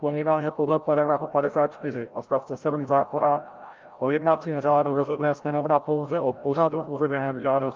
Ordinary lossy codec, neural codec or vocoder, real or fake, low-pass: Opus, 24 kbps; codec, 16 kHz, 0.5 kbps, FreqCodec, larger model; fake; 7.2 kHz